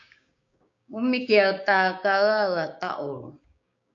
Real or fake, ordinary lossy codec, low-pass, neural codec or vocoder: fake; MP3, 64 kbps; 7.2 kHz; codec, 16 kHz, 6 kbps, DAC